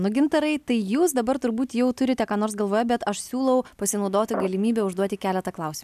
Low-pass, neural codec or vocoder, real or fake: 14.4 kHz; none; real